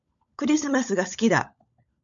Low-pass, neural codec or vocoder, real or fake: 7.2 kHz; codec, 16 kHz, 16 kbps, FunCodec, trained on LibriTTS, 50 frames a second; fake